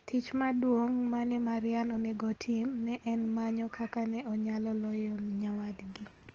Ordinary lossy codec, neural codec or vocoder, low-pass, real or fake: Opus, 16 kbps; none; 7.2 kHz; real